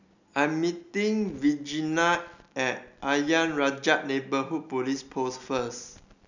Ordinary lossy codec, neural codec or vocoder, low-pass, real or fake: none; none; 7.2 kHz; real